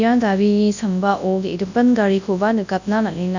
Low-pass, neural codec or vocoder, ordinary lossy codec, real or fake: 7.2 kHz; codec, 24 kHz, 0.9 kbps, WavTokenizer, large speech release; none; fake